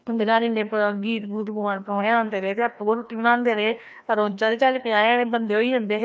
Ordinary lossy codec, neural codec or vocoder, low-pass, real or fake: none; codec, 16 kHz, 1 kbps, FreqCodec, larger model; none; fake